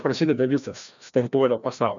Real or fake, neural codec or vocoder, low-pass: fake; codec, 16 kHz, 1 kbps, FreqCodec, larger model; 7.2 kHz